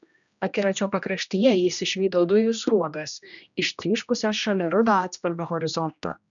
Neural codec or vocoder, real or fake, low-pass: codec, 16 kHz, 1 kbps, X-Codec, HuBERT features, trained on general audio; fake; 7.2 kHz